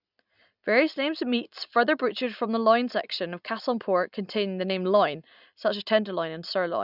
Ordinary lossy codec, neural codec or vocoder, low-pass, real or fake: none; none; 5.4 kHz; real